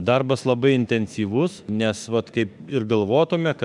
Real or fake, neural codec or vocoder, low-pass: fake; autoencoder, 48 kHz, 32 numbers a frame, DAC-VAE, trained on Japanese speech; 10.8 kHz